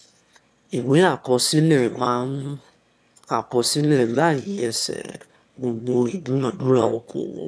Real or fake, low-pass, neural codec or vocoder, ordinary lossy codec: fake; none; autoencoder, 22.05 kHz, a latent of 192 numbers a frame, VITS, trained on one speaker; none